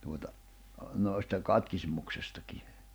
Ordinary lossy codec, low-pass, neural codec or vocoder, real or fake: none; none; vocoder, 44.1 kHz, 128 mel bands every 512 samples, BigVGAN v2; fake